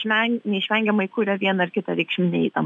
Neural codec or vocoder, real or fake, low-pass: none; real; 10.8 kHz